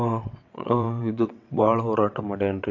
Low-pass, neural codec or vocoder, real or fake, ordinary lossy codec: 7.2 kHz; vocoder, 22.05 kHz, 80 mel bands, WaveNeXt; fake; AAC, 48 kbps